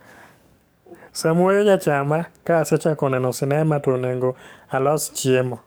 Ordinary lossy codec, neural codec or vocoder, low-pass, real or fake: none; codec, 44.1 kHz, 7.8 kbps, DAC; none; fake